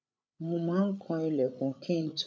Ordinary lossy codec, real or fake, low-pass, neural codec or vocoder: none; fake; none; codec, 16 kHz, 8 kbps, FreqCodec, larger model